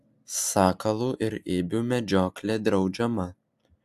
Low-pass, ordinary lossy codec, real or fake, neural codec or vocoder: 14.4 kHz; AAC, 96 kbps; real; none